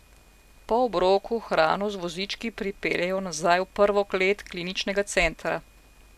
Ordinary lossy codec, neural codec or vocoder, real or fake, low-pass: AAC, 96 kbps; none; real; 14.4 kHz